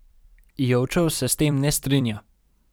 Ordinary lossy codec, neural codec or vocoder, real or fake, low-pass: none; vocoder, 44.1 kHz, 128 mel bands every 256 samples, BigVGAN v2; fake; none